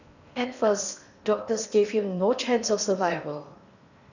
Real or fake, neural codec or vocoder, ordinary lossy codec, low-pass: fake; codec, 16 kHz in and 24 kHz out, 0.8 kbps, FocalCodec, streaming, 65536 codes; none; 7.2 kHz